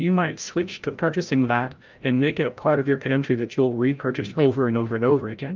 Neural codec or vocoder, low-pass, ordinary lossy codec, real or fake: codec, 16 kHz, 0.5 kbps, FreqCodec, larger model; 7.2 kHz; Opus, 24 kbps; fake